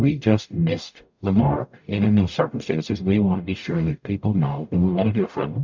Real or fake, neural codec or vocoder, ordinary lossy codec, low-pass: fake; codec, 44.1 kHz, 0.9 kbps, DAC; MP3, 64 kbps; 7.2 kHz